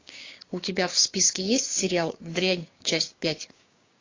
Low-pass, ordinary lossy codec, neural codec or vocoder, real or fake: 7.2 kHz; AAC, 32 kbps; codec, 16 kHz, 6 kbps, DAC; fake